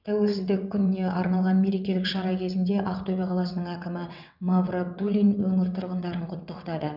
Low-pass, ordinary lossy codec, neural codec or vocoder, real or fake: 5.4 kHz; none; vocoder, 22.05 kHz, 80 mel bands, WaveNeXt; fake